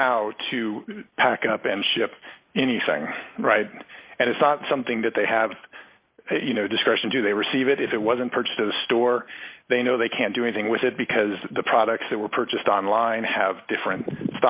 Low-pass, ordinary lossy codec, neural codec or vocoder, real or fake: 3.6 kHz; Opus, 64 kbps; none; real